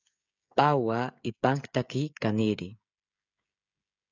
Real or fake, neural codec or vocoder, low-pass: fake; codec, 16 kHz, 16 kbps, FreqCodec, smaller model; 7.2 kHz